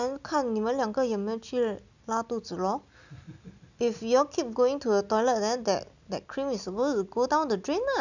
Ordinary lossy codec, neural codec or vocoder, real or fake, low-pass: none; none; real; 7.2 kHz